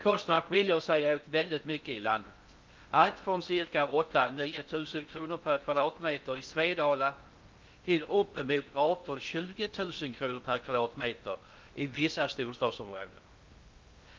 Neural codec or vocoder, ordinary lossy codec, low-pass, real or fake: codec, 16 kHz in and 24 kHz out, 0.6 kbps, FocalCodec, streaming, 4096 codes; Opus, 32 kbps; 7.2 kHz; fake